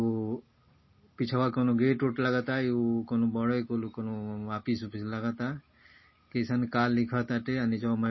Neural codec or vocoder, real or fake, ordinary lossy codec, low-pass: none; real; MP3, 24 kbps; 7.2 kHz